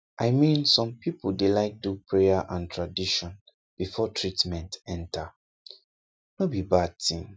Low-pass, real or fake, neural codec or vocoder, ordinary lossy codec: none; real; none; none